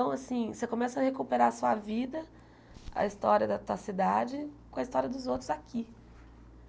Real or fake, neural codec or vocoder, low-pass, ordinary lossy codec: real; none; none; none